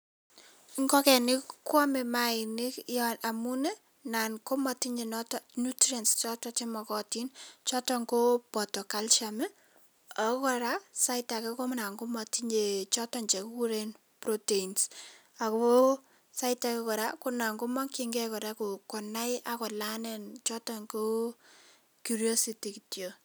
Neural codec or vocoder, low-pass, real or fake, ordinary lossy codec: none; none; real; none